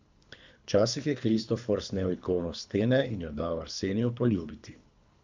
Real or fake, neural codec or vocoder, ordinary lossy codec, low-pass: fake; codec, 24 kHz, 3 kbps, HILCodec; MP3, 64 kbps; 7.2 kHz